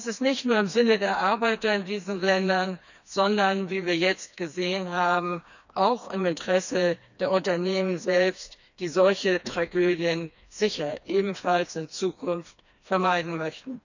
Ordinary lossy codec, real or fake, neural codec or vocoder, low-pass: none; fake; codec, 16 kHz, 2 kbps, FreqCodec, smaller model; 7.2 kHz